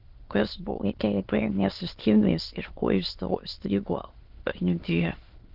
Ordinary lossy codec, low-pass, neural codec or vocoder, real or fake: Opus, 16 kbps; 5.4 kHz; autoencoder, 22.05 kHz, a latent of 192 numbers a frame, VITS, trained on many speakers; fake